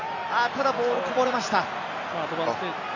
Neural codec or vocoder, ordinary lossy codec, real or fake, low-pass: none; AAC, 48 kbps; real; 7.2 kHz